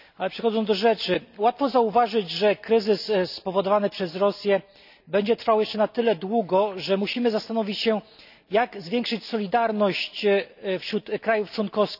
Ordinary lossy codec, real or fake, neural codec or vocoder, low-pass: none; real; none; 5.4 kHz